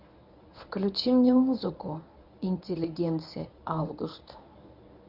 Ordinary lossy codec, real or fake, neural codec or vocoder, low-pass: none; fake; codec, 24 kHz, 0.9 kbps, WavTokenizer, medium speech release version 1; 5.4 kHz